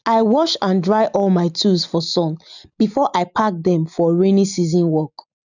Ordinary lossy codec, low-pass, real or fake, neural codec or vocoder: none; 7.2 kHz; real; none